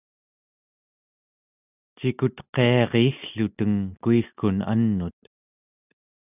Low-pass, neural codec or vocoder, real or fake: 3.6 kHz; none; real